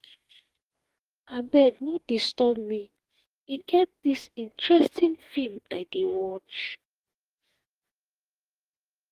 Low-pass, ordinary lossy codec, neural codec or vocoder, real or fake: 14.4 kHz; Opus, 24 kbps; codec, 44.1 kHz, 2.6 kbps, DAC; fake